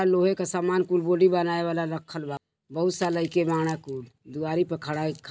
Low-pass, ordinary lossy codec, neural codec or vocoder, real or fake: none; none; none; real